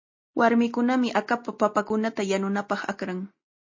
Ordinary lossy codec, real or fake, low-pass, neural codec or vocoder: MP3, 32 kbps; real; 7.2 kHz; none